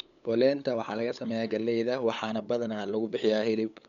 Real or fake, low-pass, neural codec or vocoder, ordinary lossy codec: fake; 7.2 kHz; codec, 16 kHz, 8 kbps, FunCodec, trained on LibriTTS, 25 frames a second; none